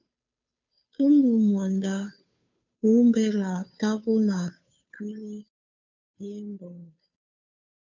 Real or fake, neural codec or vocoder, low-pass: fake; codec, 16 kHz, 2 kbps, FunCodec, trained on Chinese and English, 25 frames a second; 7.2 kHz